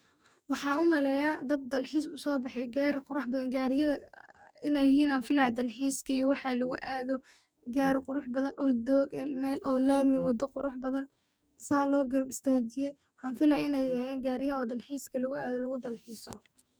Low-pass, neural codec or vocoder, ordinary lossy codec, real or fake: none; codec, 44.1 kHz, 2.6 kbps, DAC; none; fake